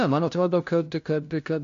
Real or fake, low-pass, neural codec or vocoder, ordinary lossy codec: fake; 7.2 kHz; codec, 16 kHz, 0.5 kbps, FunCodec, trained on LibriTTS, 25 frames a second; MP3, 48 kbps